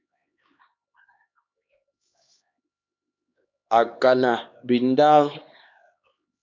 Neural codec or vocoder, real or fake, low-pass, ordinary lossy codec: codec, 16 kHz, 2 kbps, X-Codec, HuBERT features, trained on LibriSpeech; fake; 7.2 kHz; MP3, 64 kbps